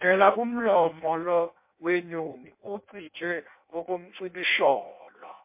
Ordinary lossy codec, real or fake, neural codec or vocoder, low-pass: MP3, 24 kbps; fake; codec, 16 kHz in and 24 kHz out, 0.6 kbps, FireRedTTS-2 codec; 3.6 kHz